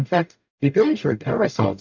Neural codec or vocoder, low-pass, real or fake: codec, 44.1 kHz, 0.9 kbps, DAC; 7.2 kHz; fake